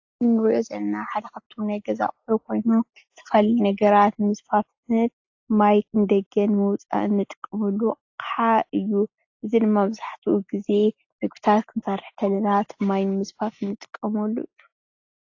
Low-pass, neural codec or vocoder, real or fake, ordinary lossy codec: 7.2 kHz; none; real; AAC, 48 kbps